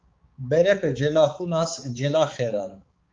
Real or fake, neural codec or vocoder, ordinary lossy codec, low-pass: fake; codec, 16 kHz, 4 kbps, X-Codec, HuBERT features, trained on balanced general audio; Opus, 32 kbps; 7.2 kHz